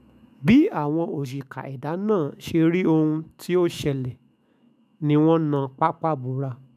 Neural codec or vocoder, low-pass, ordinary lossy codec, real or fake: autoencoder, 48 kHz, 128 numbers a frame, DAC-VAE, trained on Japanese speech; 14.4 kHz; none; fake